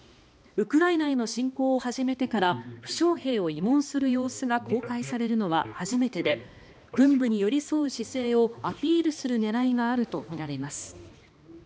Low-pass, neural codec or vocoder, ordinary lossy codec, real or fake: none; codec, 16 kHz, 2 kbps, X-Codec, HuBERT features, trained on balanced general audio; none; fake